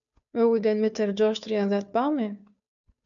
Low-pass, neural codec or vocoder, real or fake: 7.2 kHz; codec, 16 kHz, 2 kbps, FunCodec, trained on Chinese and English, 25 frames a second; fake